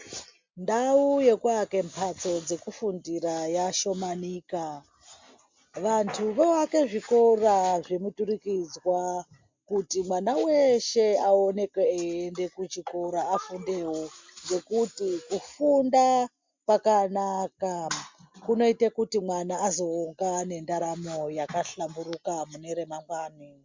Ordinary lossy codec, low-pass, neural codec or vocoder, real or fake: MP3, 64 kbps; 7.2 kHz; none; real